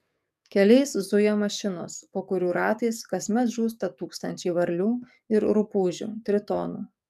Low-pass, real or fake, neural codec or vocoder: 14.4 kHz; fake; codec, 44.1 kHz, 7.8 kbps, DAC